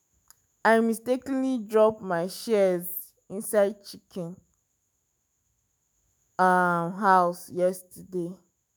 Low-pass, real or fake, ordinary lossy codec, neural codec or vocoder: none; fake; none; autoencoder, 48 kHz, 128 numbers a frame, DAC-VAE, trained on Japanese speech